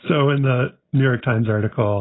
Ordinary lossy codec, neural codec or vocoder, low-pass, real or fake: AAC, 16 kbps; none; 7.2 kHz; real